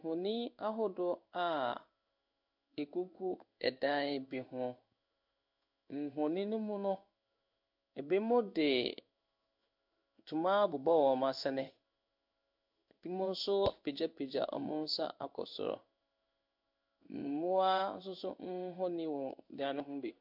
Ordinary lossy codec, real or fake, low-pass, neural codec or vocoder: MP3, 48 kbps; fake; 5.4 kHz; codec, 16 kHz in and 24 kHz out, 1 kbps, XY-Tokenizer